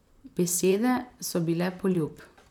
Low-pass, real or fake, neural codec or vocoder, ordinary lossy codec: 19.8 kHz; fake; vocoder, 44.1 kHz, 128 mel bands, Pupu-Vocoder; none